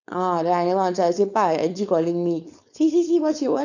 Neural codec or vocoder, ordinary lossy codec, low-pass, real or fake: codec, 16 kHz, 4.8 kbps, FACodec; none; 7.2 kHz; fake